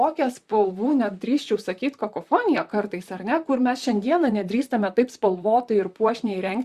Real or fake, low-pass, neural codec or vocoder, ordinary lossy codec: fake; 14.4 kHz; vocoder, 44.1 kHz, 128 mel bands, Pupu-Vocoder; Opus, 64 kbps